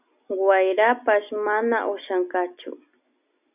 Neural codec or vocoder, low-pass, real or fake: none; 3.6 kHz; real